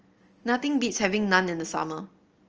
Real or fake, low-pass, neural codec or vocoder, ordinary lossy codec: real; 7.2 kHz; none; Opus, 24 kbps